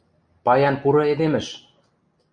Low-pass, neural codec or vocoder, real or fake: 9.9 kHz; none; real